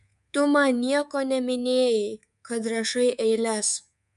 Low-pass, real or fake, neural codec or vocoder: 10.8 kHz; fake; codec, 24 kHz, 3.1 kbps, DualCodec